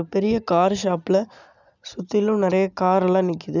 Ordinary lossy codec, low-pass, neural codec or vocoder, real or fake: none; 7.2 kHz; none; real